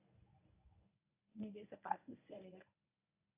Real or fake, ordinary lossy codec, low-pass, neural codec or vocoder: fake; AAC, 32 kbps; 3.6 kHz; codec, 24 kHz, 0.9 kbps, WavTokenizer, medium speech release version 1